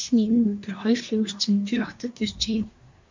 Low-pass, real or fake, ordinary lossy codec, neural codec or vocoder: 7.2 kHz; fake; MP3, 64 kbps; codec, 24 kHz, 1 kbps, SNAC